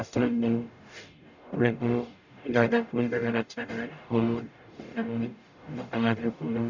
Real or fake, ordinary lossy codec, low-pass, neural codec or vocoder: fake; none; 7.2 kHz; codec, 44.1 kHz, 0.9 kbps, DAC